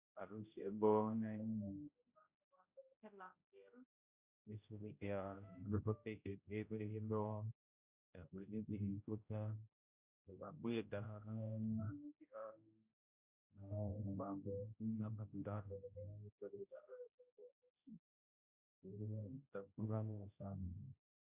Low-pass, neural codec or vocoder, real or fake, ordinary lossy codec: 3.6 kHz; codec, 16 kHz, 0.5 kbps, X-Codec, HuBERT features, trained on general audio; fake; Opus, 64 kbps